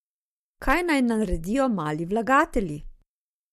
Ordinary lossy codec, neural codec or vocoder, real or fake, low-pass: MP3, 64 kbps; none; real; 14.4 kHz